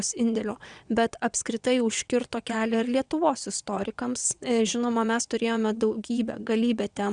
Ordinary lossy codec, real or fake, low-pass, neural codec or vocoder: Opus, 64 kbps; fake; 9.9 kHz; vocoder, 22.05 kHz, 80 mel bands, WaveNeXt